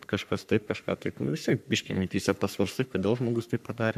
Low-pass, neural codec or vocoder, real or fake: 14.4 kHz; codec, 44.1 kHz, 3.4 kbps, Pupu-Codec; fake